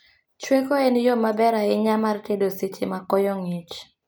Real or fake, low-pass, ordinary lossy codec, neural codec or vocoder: real; none; none; none